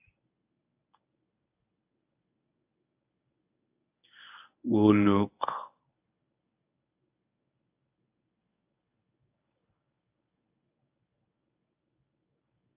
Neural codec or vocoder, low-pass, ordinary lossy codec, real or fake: codec, 24 kHz, 0.9 kbps, WavTokenizer, medium speech release version 1; 3.6 kHz; AAC, 24 kbps; fake